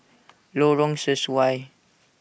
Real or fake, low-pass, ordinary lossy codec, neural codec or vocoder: real; none; none; none